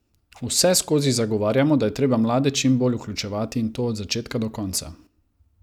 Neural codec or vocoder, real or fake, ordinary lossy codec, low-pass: none; real; none; 19.8 kHz